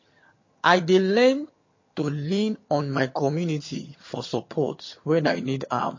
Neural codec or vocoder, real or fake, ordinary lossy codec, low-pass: vocoder, 22.05 kHz, 80 mel bands, HiFi-GAN; fake; MP3, 32 kbps; 7.2 kHz